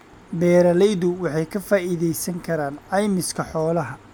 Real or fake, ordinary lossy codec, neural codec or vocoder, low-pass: real; none; none; none